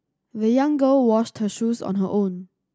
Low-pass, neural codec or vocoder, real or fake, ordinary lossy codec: none; none; real; none